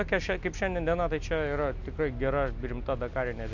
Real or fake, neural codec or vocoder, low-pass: real; none; 7.2 kHz